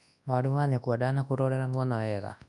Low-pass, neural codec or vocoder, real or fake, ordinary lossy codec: 10.8 kHz; codec, 24 kHz, 0.9 kbps, WavTokenizer, large speech release; fake; none